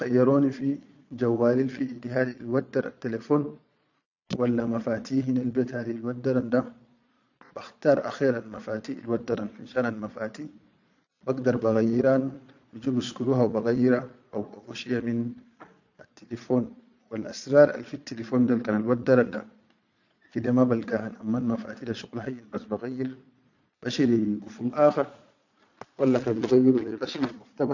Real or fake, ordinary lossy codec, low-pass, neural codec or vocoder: fake; none; 7.2 kHz; vocoder, 22.05 kHz, 80 mel bands, Vocos